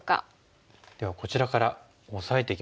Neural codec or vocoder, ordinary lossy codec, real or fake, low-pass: none; none; real; none